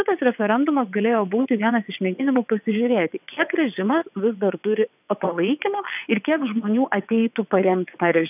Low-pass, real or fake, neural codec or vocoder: 3.6 kHz; fake; vocoder, 22.05 kHz, 80 mel bands, WaveNeXt